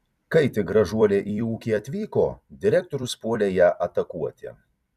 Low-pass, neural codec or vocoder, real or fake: 14.4 kHz; vocoder, 44.1 kHz, 128 mel bands every 256 samples, BigVGAN v2; fake